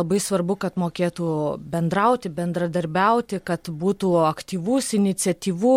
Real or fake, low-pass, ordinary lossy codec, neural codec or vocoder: real; 19.8 kHz; MP3, 64 kbps; none